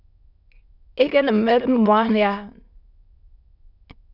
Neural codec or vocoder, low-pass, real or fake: autoencoder, 22.05 kHz, a latent of 192 numbers a frame, VITS, trained on many speakers; 5.4 kHz; fake